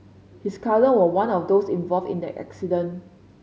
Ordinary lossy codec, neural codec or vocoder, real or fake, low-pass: none; none; real; none